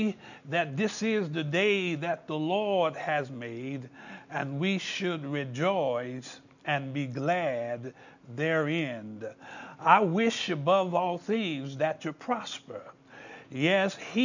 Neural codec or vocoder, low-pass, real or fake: none; 7.2 kHz; real